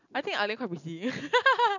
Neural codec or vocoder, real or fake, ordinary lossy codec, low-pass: none; real; none; 7.2 kHz